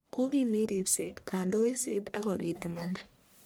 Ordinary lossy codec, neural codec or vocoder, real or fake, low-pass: none; codec, 44.1 kHz, 1.7 kbps, Pupu-Codec; fake; none